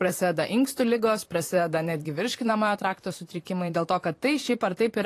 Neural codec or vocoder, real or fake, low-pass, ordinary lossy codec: vocoder, 44.1 kHz, 128 mel bands, Pupu-Vocoder; fake; 14.4 kHz; AAC, 48 kbps